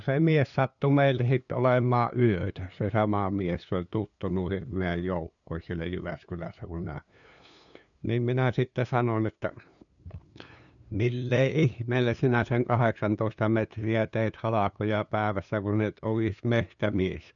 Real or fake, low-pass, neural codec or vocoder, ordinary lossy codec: fake; 7.2 kHz; codec, 16 kHz, 2 kbps, FunCodec, trained on LibriTTS, 25 frames a second; none